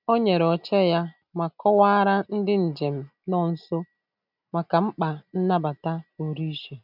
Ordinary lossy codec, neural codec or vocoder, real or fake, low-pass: none; none; real; 5.4 kHz